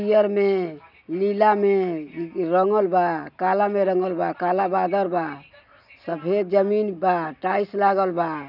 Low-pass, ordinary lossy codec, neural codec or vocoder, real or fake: 5.4 kHz; none; none; real